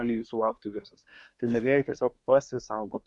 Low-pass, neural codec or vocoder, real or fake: 10.8 kHz; codec, 24 kHz, 1 kbps, SNAC; fake